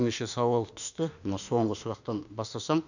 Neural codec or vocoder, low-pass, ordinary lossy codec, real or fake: autoencoder, 48 kHz, 32 numbers a frame, DAC-VAE, trained on Japanese speech; 7.2 kHz; none; fake